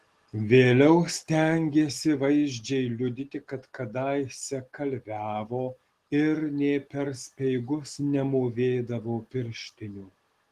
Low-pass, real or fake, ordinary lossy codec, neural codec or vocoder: 14.4 kHz; real; Opus, 16 kbps; none